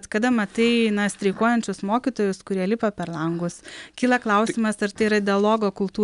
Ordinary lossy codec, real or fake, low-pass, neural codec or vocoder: MP3, 96 kbps; real; 10.8 kHz; none